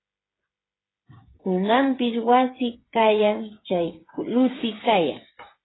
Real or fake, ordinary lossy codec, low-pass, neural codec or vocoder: fake; AAC, 16 kbps; 7.2 kHz; codec, 16 kHz, 8 kbps, FreqCodec, smaller model